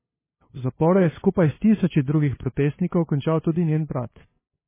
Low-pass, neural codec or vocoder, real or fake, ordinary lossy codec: 3.6 kHz; codec, 16 kHz, 2 kbps, FunCodec, trained on LibriTTS, 25 frames a second; fake; MP3, 16 kbps